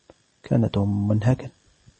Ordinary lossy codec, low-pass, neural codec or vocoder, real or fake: MP3, 32 kbps; 10.8 kHz; none; real